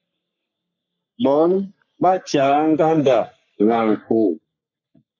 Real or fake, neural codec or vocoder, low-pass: fake; codec, 44.1 kHz, 3.4 kbps, Pupu-Codec; 7.2 kHz